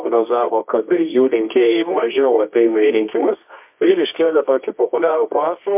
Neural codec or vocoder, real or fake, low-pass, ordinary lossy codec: codec, 24 kHz, 0.9 kbps, WavTokenizer, medium music audio release; fake; 3.6 kHz; MP3, 32 kbps